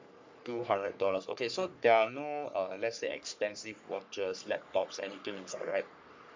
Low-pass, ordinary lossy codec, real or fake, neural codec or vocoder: 7.2 kHz; MP3, 64 kbps; fake; codec, 44.1 kHz, 3.4 kbps, Pupu-Codec